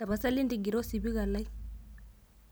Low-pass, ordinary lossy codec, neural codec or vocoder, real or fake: none; none; none; real